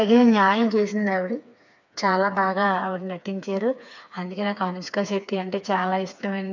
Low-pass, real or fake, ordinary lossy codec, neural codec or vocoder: 7.2 kHz; fake; none; codec, 16 kHz, 4 kbps, FreqCodec, smaller model